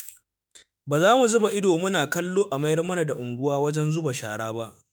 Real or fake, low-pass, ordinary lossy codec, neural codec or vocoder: fake; none; none; autoencoder, 48 kHz, 32 numbers a frame, DAC-VAE, trained on Japanese speech